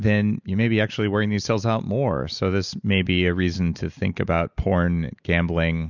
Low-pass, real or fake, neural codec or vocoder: 7.2 kHz; real; none